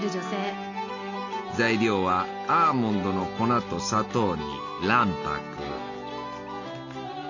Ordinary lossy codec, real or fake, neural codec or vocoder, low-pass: none; real; none; 7.2 kHz